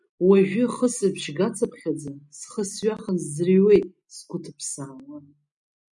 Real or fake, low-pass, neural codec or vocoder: real; 10.8 kHz; none